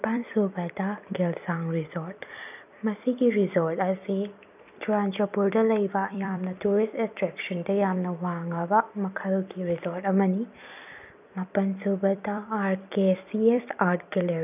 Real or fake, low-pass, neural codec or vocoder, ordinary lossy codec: fake; 3.6 kHz; vocoder, 44.1 kHz, 128 mel bands every 256 samples, BigVGAN v2; none